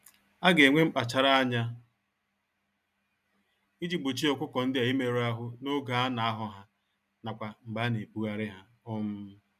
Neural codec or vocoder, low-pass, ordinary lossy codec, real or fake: none; 14.4 kHz; none; real